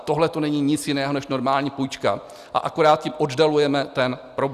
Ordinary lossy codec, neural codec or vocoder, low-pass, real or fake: Opus, 64 kbps; none; 14.4 kHz; real